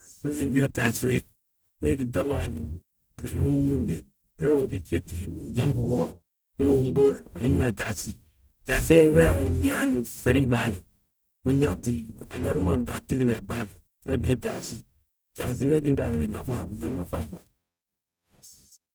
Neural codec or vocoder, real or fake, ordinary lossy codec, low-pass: codec, 44.1 kHz, 0.9 kbps, DAC; fake; none; none